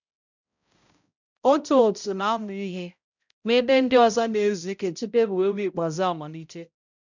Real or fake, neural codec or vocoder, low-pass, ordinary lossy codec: fake; codec, 16 kHz, 0.5 kbps, X-Codec, HuBERT features, trained on balanced general audio; 7.2 kHz; none